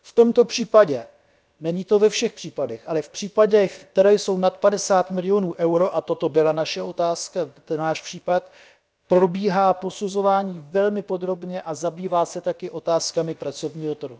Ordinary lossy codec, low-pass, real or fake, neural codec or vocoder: none; none; fake; codec, 16 kHz, about 1 kbps, DyCAST, with the encoder's durations